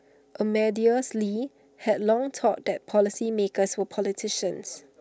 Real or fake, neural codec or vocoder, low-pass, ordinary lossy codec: real; none; none; none